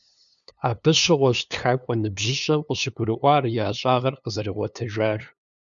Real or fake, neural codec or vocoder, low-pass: fake; codec, 16 kHz, 2 kbps, FunCodec, trained on LibriTTS, 25 frames a second; 7.2 kHz